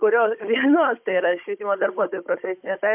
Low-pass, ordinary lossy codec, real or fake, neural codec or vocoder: 3.6 kHz; MP3, 32 kbps; fake; codec, 16 kHz, 16 kbps, FunCodec, trained on Chinese and English, 50 frames a second